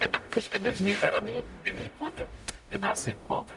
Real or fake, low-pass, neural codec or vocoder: fake; 10.8 kHz; codec, 44.1 kHz, 0.9 kbps, DAC